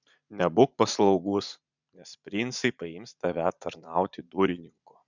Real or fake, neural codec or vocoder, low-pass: fake; vocoder, 44.1 kHz, 80 mel bands, Vocos; 7.2 kHz